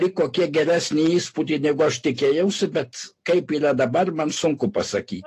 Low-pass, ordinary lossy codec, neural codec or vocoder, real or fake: 14.4 kHz; AAC, 48 kbps; none; real